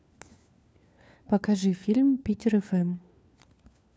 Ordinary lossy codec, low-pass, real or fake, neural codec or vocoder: none; none; fake; codec, 16 kHz, 4 kbps, FunCodec, trained on LibriTTS, 50 frames a second